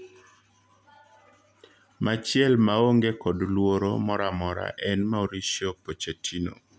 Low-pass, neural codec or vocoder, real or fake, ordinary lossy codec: none; none; real; none